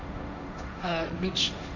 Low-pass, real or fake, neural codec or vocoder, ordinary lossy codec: 7.2 kHz; fake; codec, 16 kHz, 1.1 kbps, Voila-Tokenizer; none